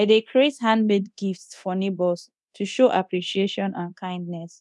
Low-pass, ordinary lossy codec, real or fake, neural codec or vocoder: none; none; fake; codec, 24 kHz, 0.9 kbps, DualCodec